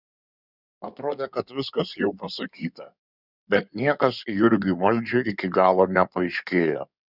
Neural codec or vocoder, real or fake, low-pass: codec, 16 kHz in and 24 kHz out, 2.2 kbps, FireRedTTS-2 codec; fake; 5.4 kHz